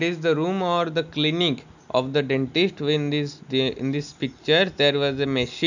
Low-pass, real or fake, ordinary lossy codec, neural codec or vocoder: 7.2 kHz; real; none; none